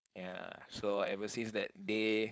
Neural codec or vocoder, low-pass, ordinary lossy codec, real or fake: codec, 16 kHz, 4.8 kbps, FACodec; none; none; fake